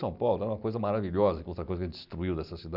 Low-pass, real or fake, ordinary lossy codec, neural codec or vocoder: 5.4 kHz; real; none; none